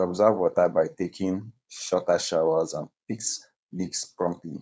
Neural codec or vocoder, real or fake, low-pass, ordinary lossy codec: codec, 16 kHz, 4.8 kbps, FACodec; fake; none; none